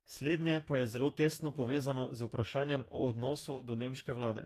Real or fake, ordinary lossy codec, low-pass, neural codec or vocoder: fake; AAC, 64 kbps; 14.4 kHz; codec, 44.1 kHz, 2.6 kbps, DAC